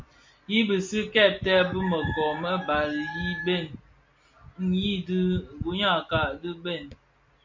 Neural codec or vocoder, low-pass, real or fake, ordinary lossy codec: none; 7.2 kHz; real; MP3, 48 kbps